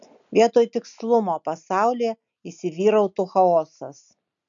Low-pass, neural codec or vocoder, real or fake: 7.2 kHz; none; real